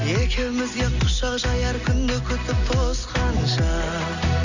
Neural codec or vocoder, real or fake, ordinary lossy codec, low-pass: none; real; none; 7.2 kHz